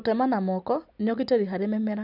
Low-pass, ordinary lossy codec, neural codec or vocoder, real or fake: 5.4 kHz; none; none; real